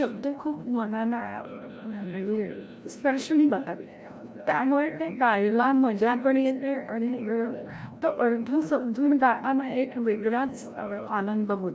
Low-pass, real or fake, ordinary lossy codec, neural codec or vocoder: none; fake; none; codec, 16 kHz, 0.5 kbps, FreqCodec, larger model